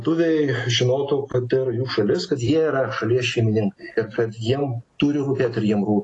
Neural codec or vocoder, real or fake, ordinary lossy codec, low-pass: none; real; AAC, 32 kbps; 10.8 kHz